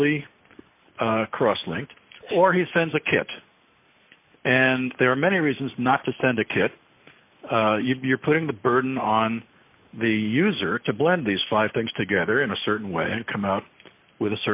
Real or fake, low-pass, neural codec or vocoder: real; 3.6 kHz; none